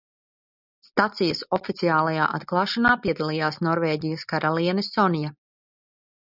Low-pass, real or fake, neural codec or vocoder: 5.4 kHz; real; none